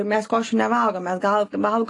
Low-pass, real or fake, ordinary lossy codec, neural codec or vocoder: 10.8 kHz; real; AAC, 48 kbps; none